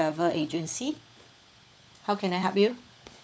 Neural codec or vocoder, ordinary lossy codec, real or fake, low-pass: codec, 16 kHz, 16 kbps, FunCodec, trained on LibriTTS, 50 frames a second; none; fake; none